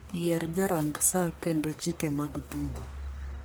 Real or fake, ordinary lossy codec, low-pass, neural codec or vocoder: fake; none; none; codec, 44.1 kHz, 1.7 kbps, Pupu-Codec